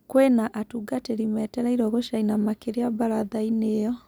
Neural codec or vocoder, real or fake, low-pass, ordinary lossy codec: none; real; none; none